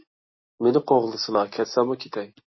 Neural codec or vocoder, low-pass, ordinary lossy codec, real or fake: none; 7.2 kHz; MP3, 24 kbps; real